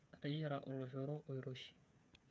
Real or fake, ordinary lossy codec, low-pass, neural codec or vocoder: fake; none; none; codec, 16 kHz, 8 kbps, FreqCodec, smaller model